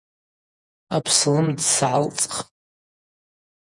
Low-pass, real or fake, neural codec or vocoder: 10.8 kHz; fake; vocoder, 48 kHz, 128 mel bands, Vocos